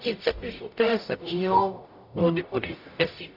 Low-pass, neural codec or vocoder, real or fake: 5.4 kHz; codec, 44.1 kHz, 0.9 kbps, DAC; fake